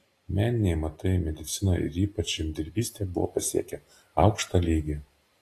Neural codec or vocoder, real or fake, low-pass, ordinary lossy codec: none; real; 14.4 kHz; AAC, 48 kbps